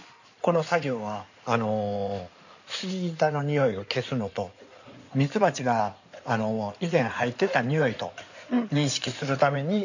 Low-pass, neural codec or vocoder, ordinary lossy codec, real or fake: 7.2 kHz; codec, 16 kHz in and 24 kHz out, 2.2 kbps, FireRedTTS-2 codec; none; fake